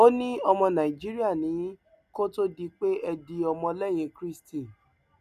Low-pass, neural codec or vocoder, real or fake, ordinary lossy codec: 14.4 kHz; none; real; none